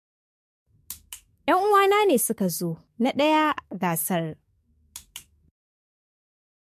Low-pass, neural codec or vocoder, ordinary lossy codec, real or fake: 14.4 kHz; codec, 44.1 kHz, 7.8 kbps, DAC; MP3, 64 kbps; fake